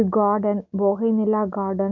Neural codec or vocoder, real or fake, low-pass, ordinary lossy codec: none; real; 7.2 kHz; none